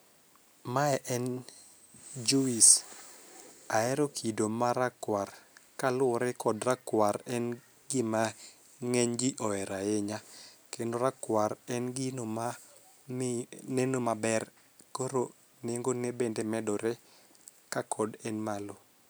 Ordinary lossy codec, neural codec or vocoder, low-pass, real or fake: none; none; none; real